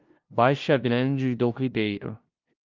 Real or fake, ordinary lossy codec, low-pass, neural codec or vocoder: fake; Opus, 24 kbps; 7.2 kHz; codec, 16 kHz, 0.5 kbps, FunCodec, trained on LibriTTS, 25 frames a second